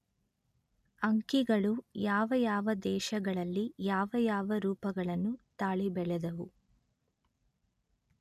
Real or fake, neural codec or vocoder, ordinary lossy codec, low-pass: real; none; none; 14.4 kHz